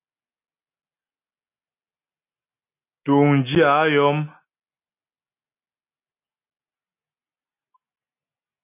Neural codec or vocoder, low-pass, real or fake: none; 3.6 kHz; real